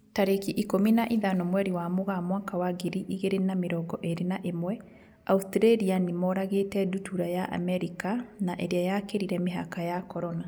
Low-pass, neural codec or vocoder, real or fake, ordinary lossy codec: none; none; real; none